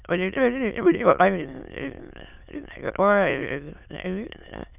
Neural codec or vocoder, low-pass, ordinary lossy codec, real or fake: autoencoder, 22.05 kHz, a latent of 192 numbers a frame, VITS, trained on many speakers; 3.6 kHz; none; fake